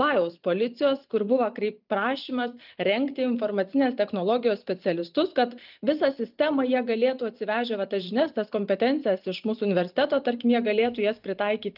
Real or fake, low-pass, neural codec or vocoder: real; 5.4 kHz; none